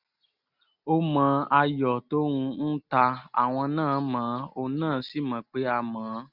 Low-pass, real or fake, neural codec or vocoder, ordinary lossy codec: 5.4 kHz; real; none; none